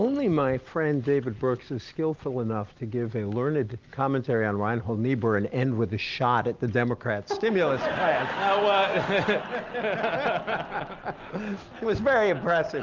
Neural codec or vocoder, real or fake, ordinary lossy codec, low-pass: none; real; Opus, 32 kbps; 7.2 kHz